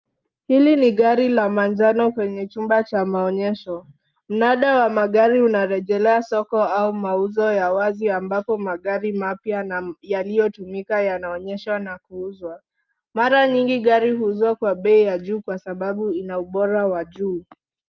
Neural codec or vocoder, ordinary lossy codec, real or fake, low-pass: none; Opus, 24 kbps; real; 7.2 kHz